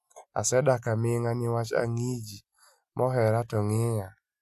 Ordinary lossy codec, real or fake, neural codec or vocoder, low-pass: AAC, 96 kbps; real; none; 14.4 kHz